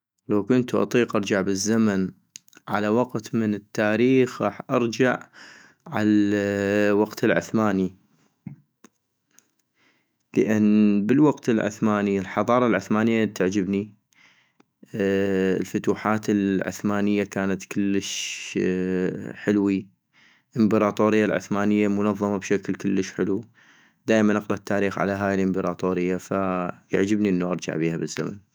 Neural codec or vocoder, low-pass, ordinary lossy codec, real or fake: autoencoder, 48 kHz, 128 numbers a frame, DAC-VAE, trained on Japanese speech; none; none; fake